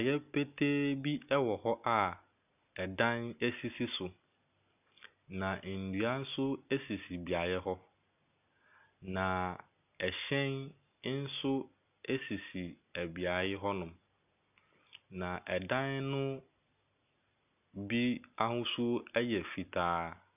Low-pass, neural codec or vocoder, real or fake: 3.6 kHz; none; real